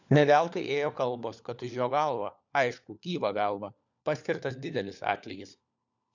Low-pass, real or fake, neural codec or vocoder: 7.2 kHz; fake; codec, 16 kHz, 4 kbps, FunCodec, trained on LibriTTS, 50 frames a second